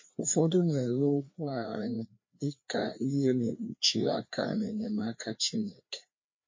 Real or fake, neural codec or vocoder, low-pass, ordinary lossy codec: fake; codec, 16 kHz, 2 kbps, FreqCodec, larger model; 7.2 kHz; MP3, 32 kbps